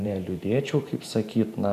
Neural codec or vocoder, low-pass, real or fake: vocoder, 44.1 kHz, 128 mel bands every 512 samples, BigVGAN v2; 14.4 kHz; fake